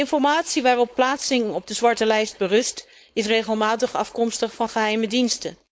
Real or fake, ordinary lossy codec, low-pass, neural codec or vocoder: fake; none; none; codec, 16 kHz, 4.8 kbps, FACodec